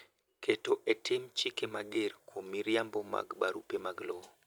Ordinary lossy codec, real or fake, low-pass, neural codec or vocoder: none; real; none; none